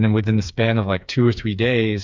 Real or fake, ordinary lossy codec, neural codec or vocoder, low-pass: fake; MP3, 64 kbps; codec, 16 kHz, 4 kbps, FreqCodec, smaller model; 7.2 kHz